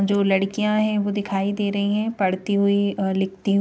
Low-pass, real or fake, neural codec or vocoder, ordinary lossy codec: none; real; none; none